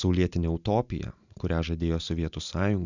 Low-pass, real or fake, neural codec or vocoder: 7.2 kHz; real; none